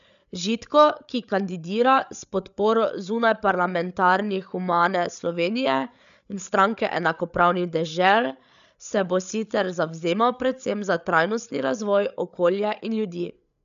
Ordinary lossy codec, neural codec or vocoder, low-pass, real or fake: none; codec, 16 kHz, 8 kbps, FreqCodec, larger model; 7.2 kHz; fake